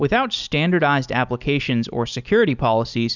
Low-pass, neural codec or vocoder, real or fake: 7.2 kHz; none; real